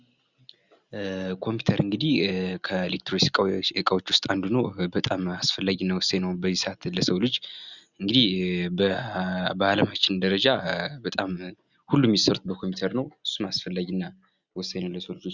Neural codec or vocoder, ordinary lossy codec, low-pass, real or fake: none; Opus, 64 kbps; 7.2 kHz; real